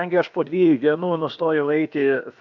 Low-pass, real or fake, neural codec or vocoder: 7.2 kHz; fake; codec, 16 kHz, about 1 kbps, DyCAST, with the encoder's durations